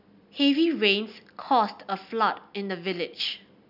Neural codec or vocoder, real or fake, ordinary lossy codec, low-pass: none; real; none; 5.4 kHz